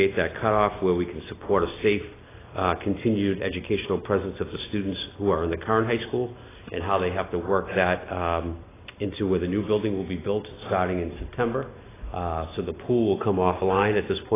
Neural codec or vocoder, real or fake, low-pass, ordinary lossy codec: none; real; 3.6 kHz; AAC, 16 kbps